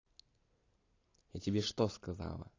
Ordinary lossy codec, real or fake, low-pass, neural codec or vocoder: AAC, 32 kbps; real; 7.2 kHz; none